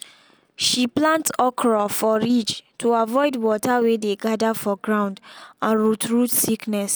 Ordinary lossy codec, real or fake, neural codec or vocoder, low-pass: none; real; none; none